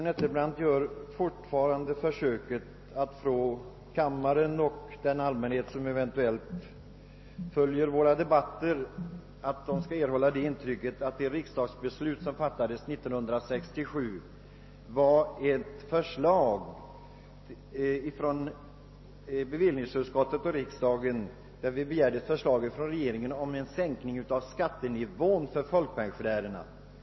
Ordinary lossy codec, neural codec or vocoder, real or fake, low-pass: MP3, 24 kbps; none; real; 7.2 kHz